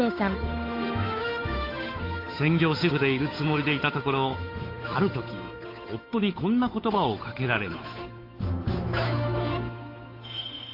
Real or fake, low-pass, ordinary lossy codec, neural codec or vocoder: fake; 5.4 kHz; AAC, 32 kbps; codec, 16 kHz, 8 kbps, FunCodec, trained on Chinese and English, 25 frames a second